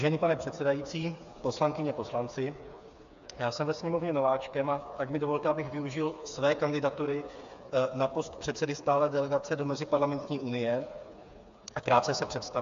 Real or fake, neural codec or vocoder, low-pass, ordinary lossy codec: fake; codec, 16 kHz, 4 kbps, FreqCodec, smaller model; 7.2 kHz; AAC, 64 kbps